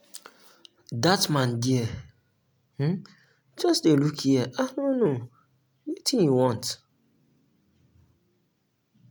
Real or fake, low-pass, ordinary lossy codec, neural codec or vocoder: real; none; none; none